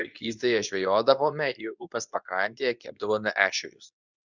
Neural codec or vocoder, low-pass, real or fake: codec, 24 kHz, 0.9 kbps, WavTokenizer, medium speech release version 2; 7.2 kHz; fake